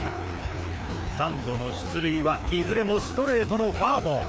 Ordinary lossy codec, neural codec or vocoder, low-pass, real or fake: none; codec, 16 kHz, 2 kbps, FreqCodec, larger model; none; fake